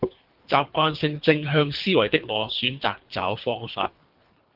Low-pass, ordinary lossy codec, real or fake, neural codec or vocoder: 5.4 kHz; Opus, 32 kbps; fake; codec, 24 kHz, 3 kbps, HILCodec